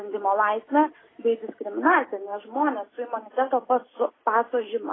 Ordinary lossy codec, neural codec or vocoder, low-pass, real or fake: AAC, 16 kbps; none; 7.2 kHz; real